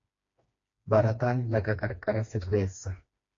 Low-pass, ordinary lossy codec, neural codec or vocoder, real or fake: 7.2 kHz; AAC, 48 kbps; codec, 16 kHz, 2 kbps, FreqCodec, smaller model; fake